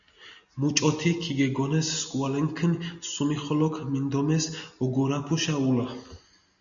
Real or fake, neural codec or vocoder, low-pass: real; none; 7.2 kHz